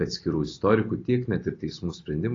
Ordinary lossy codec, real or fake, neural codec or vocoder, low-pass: AAC, 32 kbps; real; none; 7.2 kHz